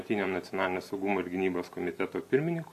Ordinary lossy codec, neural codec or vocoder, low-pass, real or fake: MP3, 64 kbps; none; 14.4 kHz; real